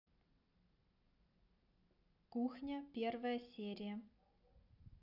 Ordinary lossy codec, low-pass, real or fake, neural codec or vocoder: none; 5.4 kHz; real; none